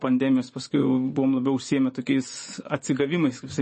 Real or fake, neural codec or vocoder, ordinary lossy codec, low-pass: fake; vocoder, 24 kHz, 100 mel bands, Vocos; MP3, 32 kbps; 10.8 kHz